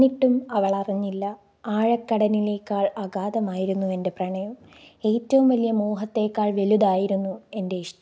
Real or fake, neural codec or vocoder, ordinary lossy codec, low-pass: real; none; none; none